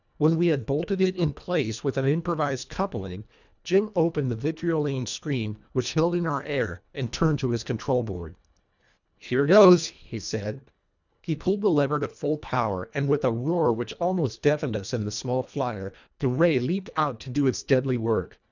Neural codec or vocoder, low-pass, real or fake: codec, 24 kHz, 1.5 kbps, HILCodec; 7.2 kHz; fake